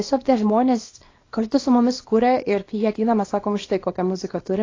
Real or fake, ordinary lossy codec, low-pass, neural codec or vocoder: fake; AAC, 32 kbps; 7.2 kHz; codec, 24 kHz, 0.9 kbps, WavTokenizer, small release